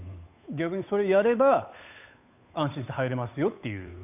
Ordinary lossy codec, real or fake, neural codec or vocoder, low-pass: none; fake; codec, 16 kHz, 8 kbps, FunCodec, trained on Chinese and English, 25 frames a second; 3.6 kHz